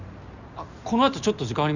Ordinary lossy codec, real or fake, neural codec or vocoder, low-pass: none; real; none; 7.2 kHz